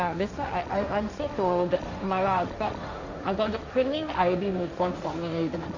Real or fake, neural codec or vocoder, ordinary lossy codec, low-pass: fake; codec, 16 kHz, 1.1 kbps, Voila-Tokenizer; none; 7.2 kHz